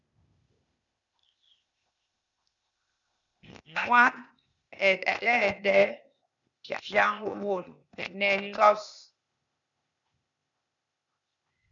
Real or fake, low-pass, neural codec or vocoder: fake; 7.2 kHz; codec, 16 kHz, 0.8 kbps, ZipCodec